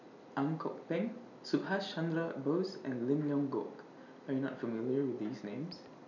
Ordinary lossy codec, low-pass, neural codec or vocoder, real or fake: none; 7.2 kHz; none; real